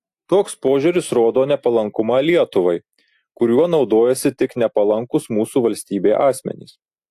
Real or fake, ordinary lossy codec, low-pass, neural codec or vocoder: real; AAC, 64 kbps; 14.4 kHz; none